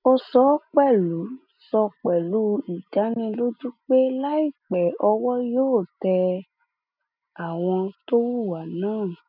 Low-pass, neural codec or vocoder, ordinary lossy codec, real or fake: 5.4 kHz; none; none; real